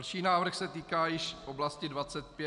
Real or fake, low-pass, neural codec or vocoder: real; 10.8 kHz; none